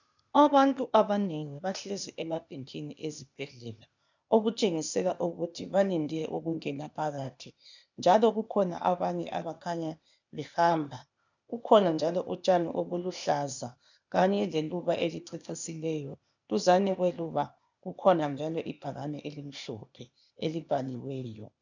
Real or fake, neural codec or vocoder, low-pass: fake; codec, 16 kHz, 0.8 kbps, ZipCodec; 7.2 kHz